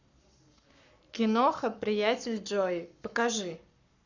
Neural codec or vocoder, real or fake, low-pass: codec, 44.1 kHz, 7.8 kbps, Pupu-Codec; fake; 7.2 kHz